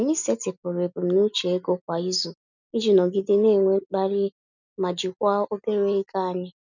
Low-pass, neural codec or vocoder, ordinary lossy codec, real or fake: 7.2 kHz; none; none; real